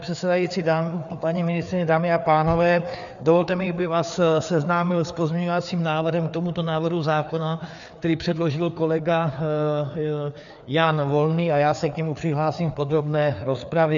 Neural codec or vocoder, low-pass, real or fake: codec, 16 kHz, 4 kbps, FreqCodec, larger model; 7.2 kHz; fake